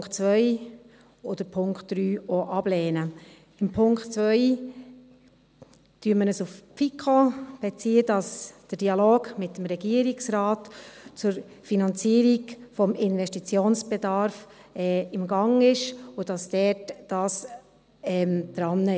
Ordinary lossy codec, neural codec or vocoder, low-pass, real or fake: none; none; none; real